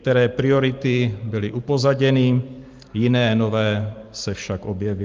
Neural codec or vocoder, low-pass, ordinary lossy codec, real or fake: none; 7.2 kHz; Opus, 32 kbps; real